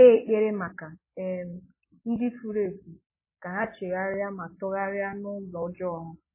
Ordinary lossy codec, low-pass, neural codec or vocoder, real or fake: MP3, 16 kbps; 3.6 kHz; none; real